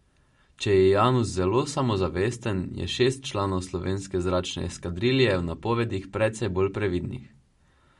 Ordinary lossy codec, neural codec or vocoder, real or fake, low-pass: MP3, 48 kbps; none; real; 14.4 kHz